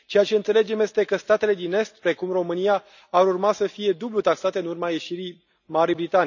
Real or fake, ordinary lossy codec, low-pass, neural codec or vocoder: real; none; 7.2 kHz; none